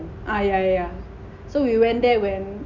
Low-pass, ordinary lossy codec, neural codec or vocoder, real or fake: 7.2 kHz; none; none; real